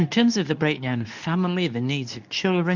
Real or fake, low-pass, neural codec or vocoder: fake; 7.2 kHz; codec, 24 kHz, 0.9 kbps, WavTokenizer, medium speech release version 2